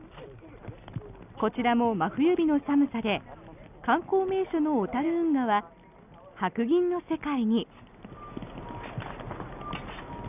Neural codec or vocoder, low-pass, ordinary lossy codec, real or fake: none; 3.6 kHz; none; real